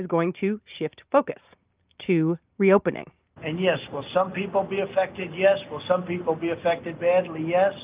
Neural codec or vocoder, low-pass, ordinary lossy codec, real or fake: none; 3.6 kHz; Opus, 24 kbps; real